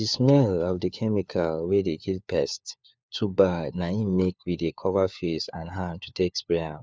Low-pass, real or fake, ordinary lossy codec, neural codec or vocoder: none; fake; none; codec, 16 kHz, 4 kbps, FunCodec, trained on LibriTTS, 50 frames a second